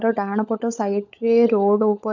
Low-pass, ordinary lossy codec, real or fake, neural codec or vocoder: 7.2 kHz; none; fake; codec, 16 kHz, 16 kbps, FunCodec, trained on LibriTTS, 50 frames a second